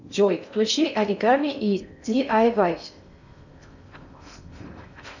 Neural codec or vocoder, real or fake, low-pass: codec, 16 kHz in and 24 kHz out, 0.6 kbps, FocalCodec, streaming, 4096 codes; fake; 7.2 kHz